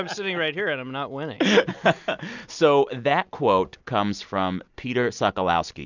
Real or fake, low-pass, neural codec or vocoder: real; 7.2 kHz; none